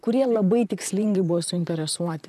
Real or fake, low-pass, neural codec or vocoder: fake; 14.4 kHz; vocoder, 44.1 kHz, 128 mel bands, Pupu-Vocoder